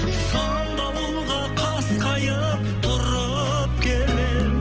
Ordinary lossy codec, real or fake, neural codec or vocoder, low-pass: Opus, 16 kbps; real; none; 7.2 kHz